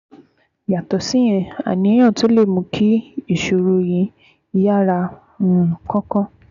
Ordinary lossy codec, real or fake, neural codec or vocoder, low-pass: none; real; none; 7.2 kHz